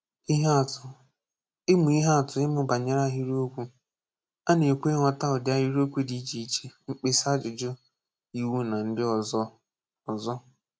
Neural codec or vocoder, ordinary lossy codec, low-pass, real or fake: none; none; none; real